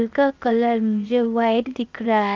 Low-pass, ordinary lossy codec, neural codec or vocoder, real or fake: 7.2 kHz; Opus, 24 kbps; codec, 16 kHz, 0.8 kbps, ZipCodec; fake